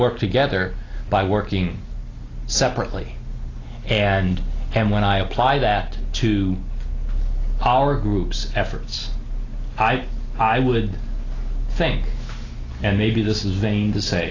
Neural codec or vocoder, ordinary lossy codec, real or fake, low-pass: none; AAC, 32 kbps; real; 7.2 kHz